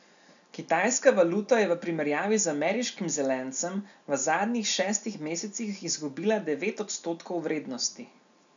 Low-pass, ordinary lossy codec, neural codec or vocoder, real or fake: 7.2 kHz; none; none; real